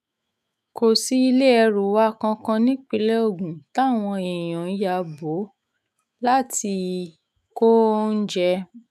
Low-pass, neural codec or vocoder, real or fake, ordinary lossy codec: 14.4 kHz; autoencoder, 48 kHz, 128 numbers a frame, DAC-VAE, trained on Japanese speech; fake; none